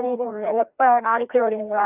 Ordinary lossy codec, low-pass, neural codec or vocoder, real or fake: none; 3.6 kHz; codec, 16 kHz, 1 kbps, FreqCodec, larger model; fake